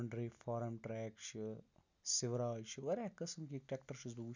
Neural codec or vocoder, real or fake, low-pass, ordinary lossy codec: none; real; 7.2 kHz; none